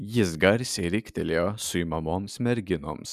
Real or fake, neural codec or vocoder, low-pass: real; none; 14.4 kHz